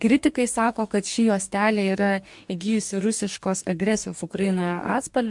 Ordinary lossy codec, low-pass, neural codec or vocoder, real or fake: MP3, 64 kbps; 10.8 kHz; codec, 44.1 kHz, 2.6 kbps, DAC; fake